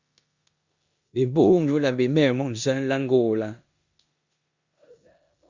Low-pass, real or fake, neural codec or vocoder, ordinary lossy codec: 7.2 kHz; fake; codec, 16 kHz in and 24 kHz out, 0.9 kbps, LongCat-Audio-Codec, four codebook decoder; Opus, 64 kbps